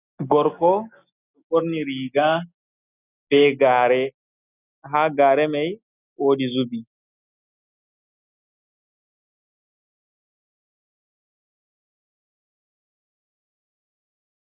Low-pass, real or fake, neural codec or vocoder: 3.6 kHz; real; none